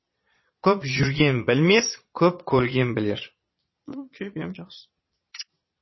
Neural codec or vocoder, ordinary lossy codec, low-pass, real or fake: vocoder, 22.05 kHz, 80 mel bands, Vocos; MP3, 24 kbps; 7.2 kHz; fake